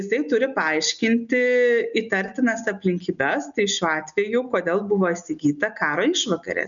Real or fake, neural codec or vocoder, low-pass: real; none; 7.2 kHz